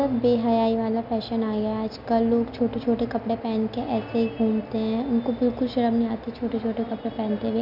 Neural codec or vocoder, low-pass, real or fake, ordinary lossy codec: none; 5.4 kHz; real; none